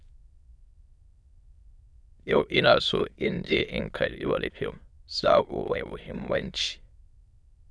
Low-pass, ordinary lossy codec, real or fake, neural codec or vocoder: none; none; fake; autoencoder, 22.05 kHz, a latent of 192 numbers a frame, VITS, trained on many speakers